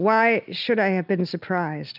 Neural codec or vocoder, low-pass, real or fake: none; 5.4 kHz; real